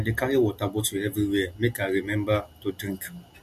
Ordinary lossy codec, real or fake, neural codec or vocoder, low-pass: MP3, 64 kbps; real; none; 14.4 kHz